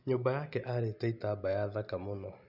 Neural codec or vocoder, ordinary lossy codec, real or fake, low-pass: none; none; real; 5.4 kHz